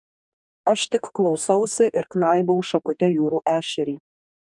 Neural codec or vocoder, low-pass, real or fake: codec, 44.1 kHz, 2.6 kbps, DAC; 10.8 kHz; fake